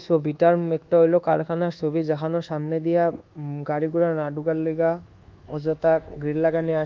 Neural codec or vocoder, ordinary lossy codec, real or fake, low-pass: codec, 24 kHz, 1.2 kbps, DualCodec; Opus, 32 kbps; fake; 7.2 kHz